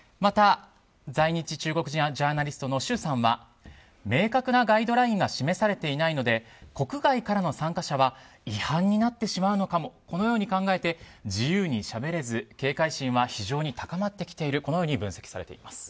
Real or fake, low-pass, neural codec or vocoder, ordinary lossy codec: real; none; none; none